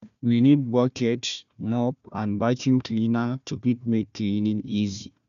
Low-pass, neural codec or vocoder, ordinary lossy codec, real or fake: 7.2 kHz; codec, 16 kHz, 1 kbps, FunCodec, trained on Chinese and English, 50 frames a second; none; fake